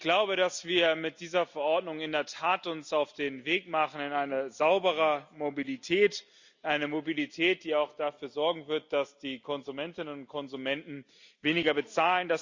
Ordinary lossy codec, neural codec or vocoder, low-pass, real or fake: Opus, 64 kbps; none; 7.2 kHz; real